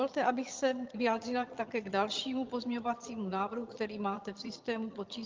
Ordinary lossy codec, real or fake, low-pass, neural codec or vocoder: Opus, 24 kbps; fake; 7.2 kHz; vocoder, 22.05 kHz, 80 mel bands, HiFi-GAN